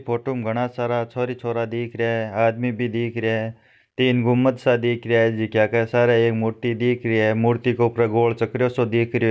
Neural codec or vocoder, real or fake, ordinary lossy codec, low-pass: none; real; none; none